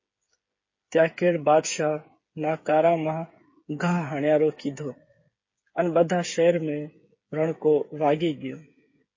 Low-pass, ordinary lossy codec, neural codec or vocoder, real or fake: 7.2 kHz; MP3, 32 kbps; codec, 16 kHz, 8 kbps, FreqCodec, smaller model; fake